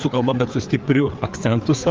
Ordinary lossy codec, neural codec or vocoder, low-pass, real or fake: Opus, 32 kbps; codec, 16 kHz, 4 kbps, FreqCodec, larger model; 7.2 kHz; fake